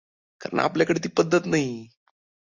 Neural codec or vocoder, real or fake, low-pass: none; real; 7.2 kHz